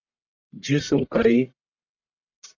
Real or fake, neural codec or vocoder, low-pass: fake; codec, 44.1 kHz, 1.7 kbps, Pupu-Codec; 7.2 kHz